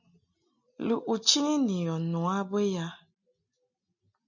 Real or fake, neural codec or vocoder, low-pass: real; none; 7.2 kHz